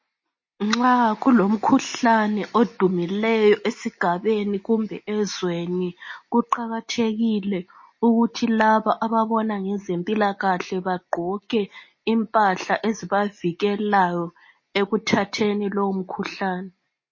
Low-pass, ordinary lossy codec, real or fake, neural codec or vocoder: 7.2 kHz; MP3, 32 kbps; real; none